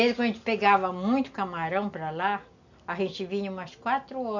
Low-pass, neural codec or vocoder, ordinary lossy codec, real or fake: 7.2 kHz; none; none; real